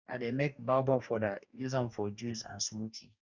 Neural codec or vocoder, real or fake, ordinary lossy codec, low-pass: codec, 44.1 kHz, 2.6 kbps, DAC; fake; none; 7.2 kHz